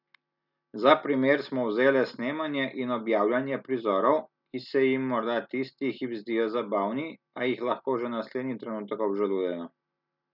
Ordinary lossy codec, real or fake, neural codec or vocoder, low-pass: none; real; none; 5.4 kHz